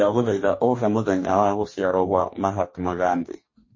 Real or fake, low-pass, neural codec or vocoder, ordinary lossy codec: fake; 7.2 kHz; codec, 44.1 kHz, 2.6 kbps, DAC; MP3, 32 kbps